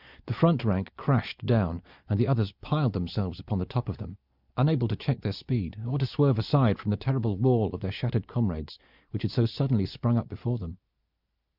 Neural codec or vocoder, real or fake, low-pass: none; real; 5.4 kHz